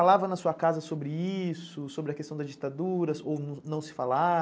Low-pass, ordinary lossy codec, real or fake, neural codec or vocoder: none; none; real; none